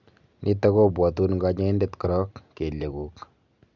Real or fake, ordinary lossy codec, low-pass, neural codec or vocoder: real; none; 7.2 kHz; none